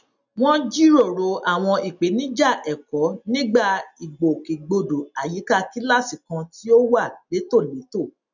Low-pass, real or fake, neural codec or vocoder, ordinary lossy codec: 7.2 kHz; real; none; none